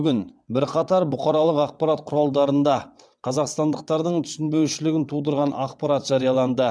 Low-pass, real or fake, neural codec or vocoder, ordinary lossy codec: none; fake; vocoder, 22.05 kHz, 80 mel bands, WaveNeXt; none